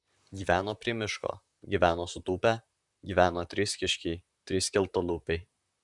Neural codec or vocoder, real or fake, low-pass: vocoder, 44.1 kHz, 128 mel bands, Pupu-Vocoder; fake; 10.8 kHz